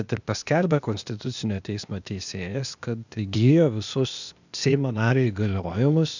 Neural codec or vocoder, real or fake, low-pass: codec, 16 kHz, 0.8 kbps, ZipCodec; fake; 7.2 kHz